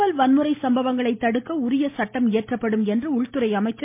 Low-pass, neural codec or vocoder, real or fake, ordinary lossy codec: 3.6 kHz; none; real; MP3, 24 kbps